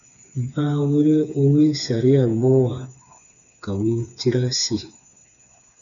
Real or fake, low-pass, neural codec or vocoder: fake; 7.2 kHz; codec, 16 kHz, 4 kbps, FreqCodec, smaller model